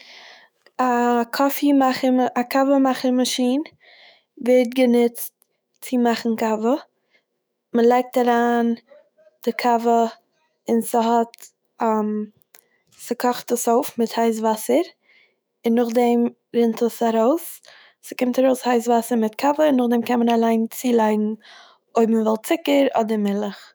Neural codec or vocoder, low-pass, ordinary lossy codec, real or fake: autoencoder, 48 kHz, 128 numbers a frame, DAC-VAE, trained on Japanese speech; none; none; fake